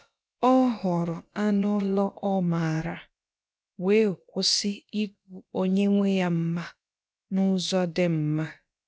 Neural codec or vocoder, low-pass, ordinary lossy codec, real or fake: codec, 16 kHz, about 1 kbps, DyCAST, with the encoder's durations; none; none; fake